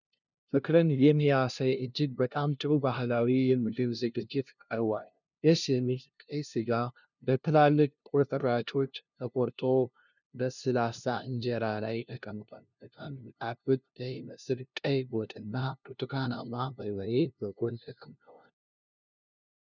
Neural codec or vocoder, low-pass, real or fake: codec, 16 kHz, 0.5 kbps, FunCodec, trained on LibriTTS, 25 frames a second; 7.2 kHz; fake